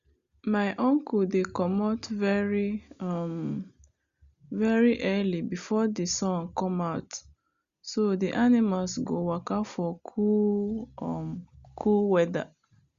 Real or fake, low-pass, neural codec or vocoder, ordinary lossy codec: real; 7.2 kHz; none; Opus, 64 kbps